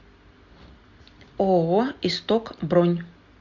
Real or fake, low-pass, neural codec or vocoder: real; 7.2 kHz; none